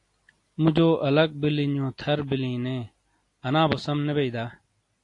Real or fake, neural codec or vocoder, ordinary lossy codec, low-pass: real; none; AAC, 48 kbps; 10.8 kHz